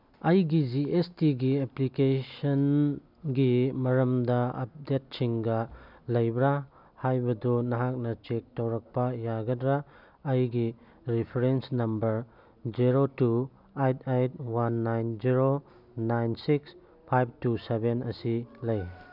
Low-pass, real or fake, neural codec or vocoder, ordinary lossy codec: 5.4 kHz; real; none; none